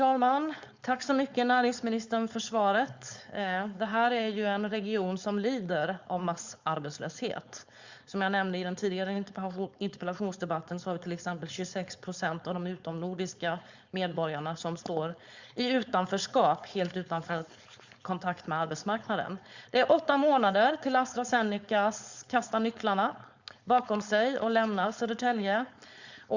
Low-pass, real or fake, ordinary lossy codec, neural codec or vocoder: 7.2 kHz; fake; Opus, 64 kbps; codec, 16 kHz, 4.8 kbps, FACodec